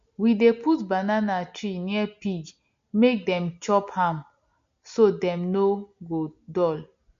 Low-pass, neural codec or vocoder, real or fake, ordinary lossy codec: 7.2 kHz; none; real; MP3, 48 kbps